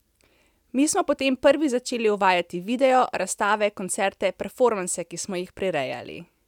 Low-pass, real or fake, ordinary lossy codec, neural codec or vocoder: 19.8 kHz; real; none; none